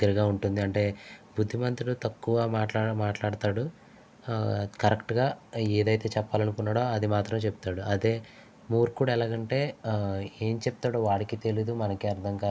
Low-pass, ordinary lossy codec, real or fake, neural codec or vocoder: none; none; real; none